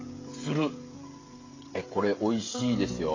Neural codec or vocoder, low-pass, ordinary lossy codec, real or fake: codec, 16 kHz, 16 kbps, FreqCodec, smaller model; 7.2 kHz; AAC, 32 kbps; fake